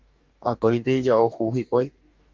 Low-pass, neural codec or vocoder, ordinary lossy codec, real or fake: 7.2 kHz; codec, 44.1 kHz, 2.6 kbps, DAC; Opus, 32 kbps; fake